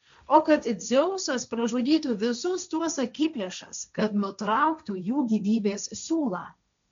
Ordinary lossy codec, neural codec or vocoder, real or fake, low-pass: MP3, 64 kbps; codec, 16 kHz, 1.1 kbps, Voila-Tokenizer; fake; 7.2 kHz